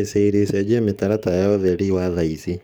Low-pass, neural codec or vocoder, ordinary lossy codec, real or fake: none; codec, 44.1 kHz, 7.8 kbps, DAC; none; fake